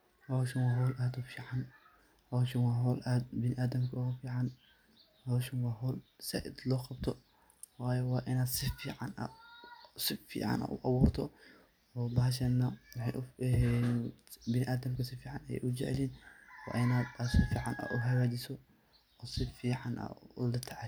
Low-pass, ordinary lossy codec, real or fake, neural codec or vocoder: none; none; real; none